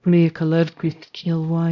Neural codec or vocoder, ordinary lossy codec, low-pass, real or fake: codec, 16 kHz, 0.5 kbps, X-Codec, HuBERT features, trained on LibriSpeech; none; 7.2 kHz; fake